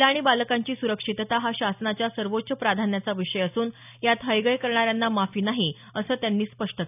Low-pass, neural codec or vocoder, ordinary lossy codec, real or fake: 3.6 kHz; none; none; real